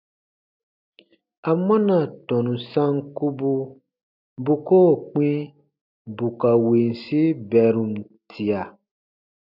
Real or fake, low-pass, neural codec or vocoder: real; 5.4 kHz; none